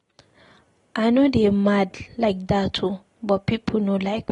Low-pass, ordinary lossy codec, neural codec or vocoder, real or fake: 10.8 kHz; AAC, 32 kbps; none; real